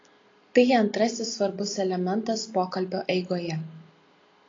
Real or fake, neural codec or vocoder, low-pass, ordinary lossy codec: real; none; 7.2 kHz; AAC, 32 kbps